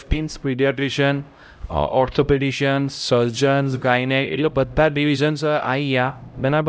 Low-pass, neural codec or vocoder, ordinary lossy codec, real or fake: none; codec, 16 kHz, 0.5 kbps, X-Codec, HuBERT features, trained on LibriSpeech; none; fake